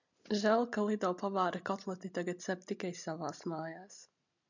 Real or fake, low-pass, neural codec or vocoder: real; 7.2 kHz; none